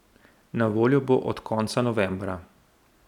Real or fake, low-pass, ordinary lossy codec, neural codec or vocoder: real; 19.8 kHz; none; none